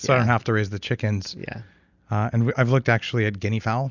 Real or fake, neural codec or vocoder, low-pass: real; none; 7.2 kHz